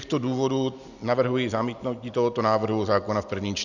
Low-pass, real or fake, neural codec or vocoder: 7.2 kHz; real; none